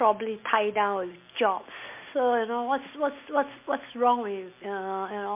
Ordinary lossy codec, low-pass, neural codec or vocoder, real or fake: MP3, 24 kbps; 3.6 kHz; none; real